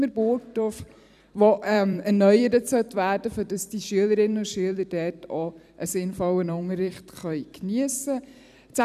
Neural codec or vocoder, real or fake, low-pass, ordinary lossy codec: vocoder, 44.1 kHz, 128 mel bands every 256 samples, BigVGAN v2; fake; 14.4 kHz; none